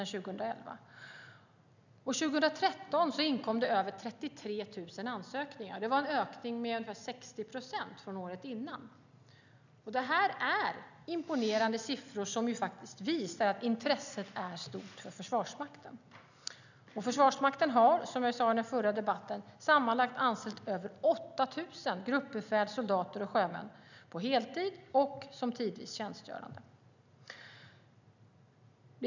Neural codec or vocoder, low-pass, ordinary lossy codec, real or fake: none; 7.2 kHz; none; real